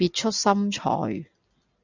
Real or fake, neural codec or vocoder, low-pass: real; none; 7.2 kHz